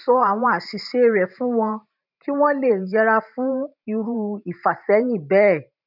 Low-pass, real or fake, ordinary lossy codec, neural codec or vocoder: 5.4 kHz; fake; none; vocoder, 44.1 kHz, 128 mel bands every 512 samples, BigVGAN v2